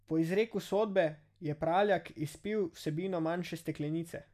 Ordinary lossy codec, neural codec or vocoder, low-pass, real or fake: none; none; 14.4 kHz; real